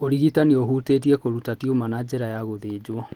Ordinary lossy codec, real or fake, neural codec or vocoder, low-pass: Opus, 16 kbps; real; none; 19.8 kHz